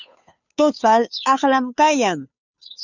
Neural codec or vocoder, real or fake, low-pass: codec, 16 kHz, 2 kbps, FunCodec, trained on Chinese and English, 25 frames a second; fake; 7.2 kHz